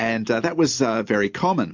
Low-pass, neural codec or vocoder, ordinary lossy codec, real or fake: 7.2 kHz; none; MP3, 64 kbps; real